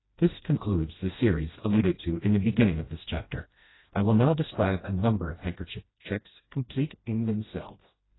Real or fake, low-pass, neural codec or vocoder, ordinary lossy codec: fake; 7.2 kHz; codec, 16 kHz, 1 kbps, FreqCodec, smaller model; AAC, 16 kbps